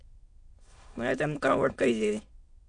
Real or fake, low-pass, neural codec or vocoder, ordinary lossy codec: fake; 9.9 kHz; autoencoder, 22.05 kHz, a latent of 192 numbers a frame, VITS, trained on many speakers; MP3, 48 kbps